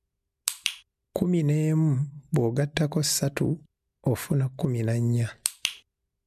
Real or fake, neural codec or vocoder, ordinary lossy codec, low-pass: real; none; MP3, 96 kbps; 14.4 kHz